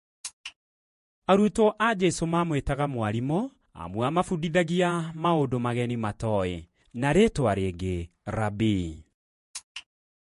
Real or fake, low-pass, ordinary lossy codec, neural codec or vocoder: fake; 14.4 kHz; MP3, 48 kbps; vocoder, 48 kHz, 128 mel bands, Vocos